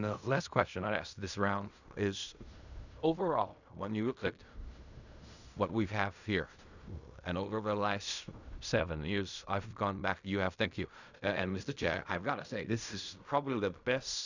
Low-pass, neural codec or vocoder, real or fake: 7.2 kHz; codec, 16 kHz in and 24 kHz out, 0.4 kbps, LongCat-Audio-Codec, fine tuned four codebook decoder; fake